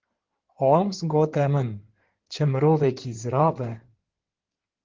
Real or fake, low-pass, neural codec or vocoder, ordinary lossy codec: fake; 7.2 kHz; codec, 16 kHz in and 24 kHz out, 2.2 kbps, FireRedTTS-2 codec; Opus, 16 kbps